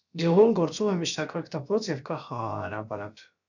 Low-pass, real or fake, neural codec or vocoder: 7.2 kHz; fake; codec, 16 kHz, about 1 kbps, DyCAST, with the encoder's durations